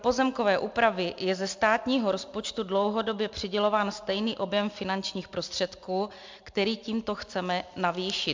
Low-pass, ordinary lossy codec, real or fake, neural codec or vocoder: 7.2 kHz; MP3, 64 kbps; real; none